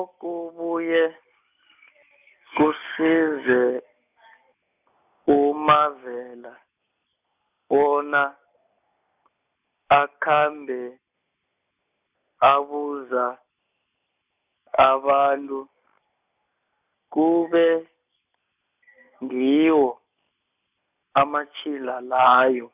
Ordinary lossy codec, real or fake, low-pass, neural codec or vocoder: none; real; 3.6 kHz; none